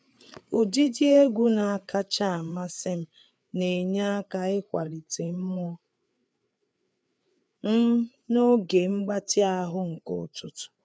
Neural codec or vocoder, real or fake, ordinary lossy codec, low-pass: codec, 16 kHz, 4 kbps, FreqCodec, larger model; fake; none; none